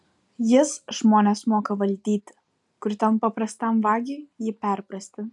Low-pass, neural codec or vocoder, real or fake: 10.8 kHz; none; real